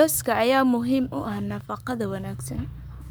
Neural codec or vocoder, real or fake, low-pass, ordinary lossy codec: codec, 44.1 kHz, 7.8 kbps, Pupu-Codec; fake; none; none